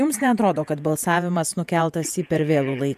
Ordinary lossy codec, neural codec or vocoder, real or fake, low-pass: MP3, 96 kbps; vocoder, 48 kHz, 128 mel bands, Vocos; fake; 14.4 kHz